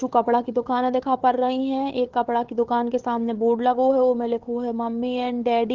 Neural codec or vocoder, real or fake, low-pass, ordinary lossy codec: codec, 16 kHz, 4 kbps, FunCodec, trained on Chinese and English, 50 frames a second; fake; 7.2 kHz; Opus, 16 kbps